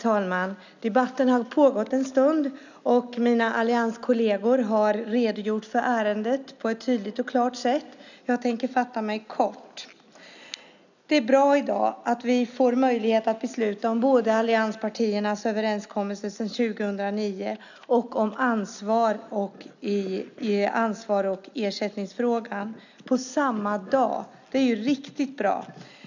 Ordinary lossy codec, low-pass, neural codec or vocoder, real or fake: none; 7.2 kHz; none; real